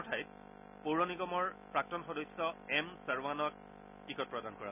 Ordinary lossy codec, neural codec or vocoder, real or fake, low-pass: none; none; real; 3.6 kHz